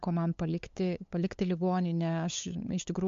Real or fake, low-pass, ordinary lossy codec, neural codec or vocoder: fake; 7.2 kHz; MP3, 48 kbps; codec, 16 kHz, 8 kbps, FunCodec, trained on LibriTTS, 25 frames a second